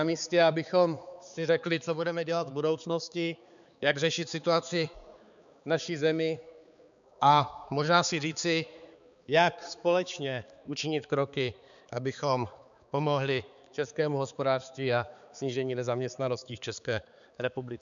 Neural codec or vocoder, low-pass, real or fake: codec, 16 kHz, 4 kbps, X-Codec, HuBERT features, trained on balanced general audio; 7.2 kHz; fake